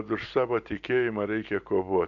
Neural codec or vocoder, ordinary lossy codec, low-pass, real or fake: none; AAC, 64 kbps; 7.2 kHz; real